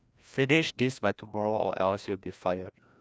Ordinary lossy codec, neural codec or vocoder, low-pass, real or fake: none; codec, 16 kHz, 1 kbps, FreqCodec, larger model; none; fake